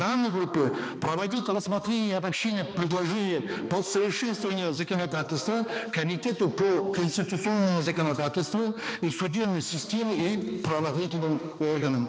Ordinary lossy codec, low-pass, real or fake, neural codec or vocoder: none; none; fake; codec, 16 kHz, 2 kbps, X-Codec, HuBERT features, trained on balanced general audio